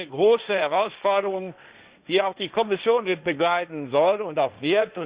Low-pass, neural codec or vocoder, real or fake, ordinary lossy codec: 3.6 kHz; codec, 16 kHz, 1.1 kbps, Voila-Tokenizer; fake; Opus, 64 kbps